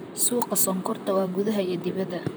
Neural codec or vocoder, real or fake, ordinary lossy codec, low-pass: vocoder, 44.1 kHz, 128 mel bands, Pupu-Vocoder; fake; none; none